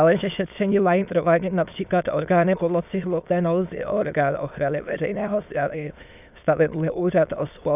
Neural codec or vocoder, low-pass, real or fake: autoencoder, 22.05 kHz, a latent of 192 numbers a frame, VITS, trained on many speakers; 3.6 kHz; fake